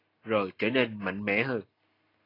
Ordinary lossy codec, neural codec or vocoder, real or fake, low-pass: AAC, 24 kbps; none; real; 5.4 kHz